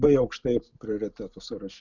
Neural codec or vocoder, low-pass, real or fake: none; 7.2 kHz; real